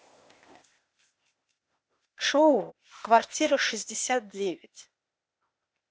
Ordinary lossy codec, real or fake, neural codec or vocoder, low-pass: none; fake; codec, 16 kHz, 0.8 kbps, ZipCodec; none